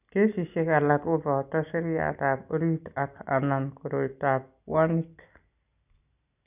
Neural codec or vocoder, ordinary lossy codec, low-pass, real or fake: none; none; 3.6 kHz; real